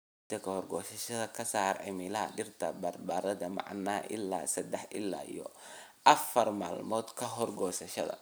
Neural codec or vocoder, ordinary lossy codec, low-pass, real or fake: none; none; none; real